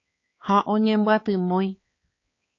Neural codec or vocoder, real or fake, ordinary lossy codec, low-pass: codec, 16 kHz, 4 kbps, X-Codec, HuBERT features, trained on balanced general audio; fake; AAC, 32 kbps; 7.2 kHz